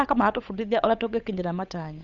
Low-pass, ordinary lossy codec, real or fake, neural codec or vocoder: 7.2 kHz; none; fake; codec, 16 kHz, 8 kbps, FunCodec, trained on Chinese and English, 25 frames a second